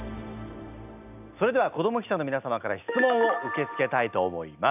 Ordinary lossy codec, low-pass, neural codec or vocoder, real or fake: none; 3.6 kHz; none; real